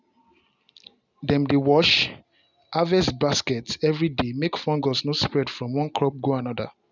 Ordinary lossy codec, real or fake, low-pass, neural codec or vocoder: none; real; 7.2 kHz; none